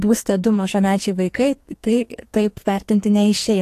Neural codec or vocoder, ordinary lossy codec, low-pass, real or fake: codec, 44.1 kHz, 2.6 kbps, SNAC; AAC, 64 kbps; 14.4 kHz; fake